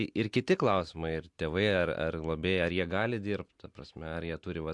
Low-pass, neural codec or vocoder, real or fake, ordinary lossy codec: 10.8 kHz; none; real; MP3, 64 kbps